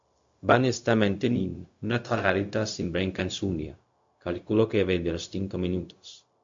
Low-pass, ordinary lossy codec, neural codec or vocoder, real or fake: 7.2 kHz; MP3, 48 kbps; codec, 16 kHz, 0.4 kbps, LongCat-Audio-Codec; fake